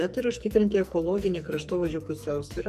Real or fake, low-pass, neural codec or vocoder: fake; 14.4 kHz; codec, 44.1 kHz, 2.6 kbps, SNAC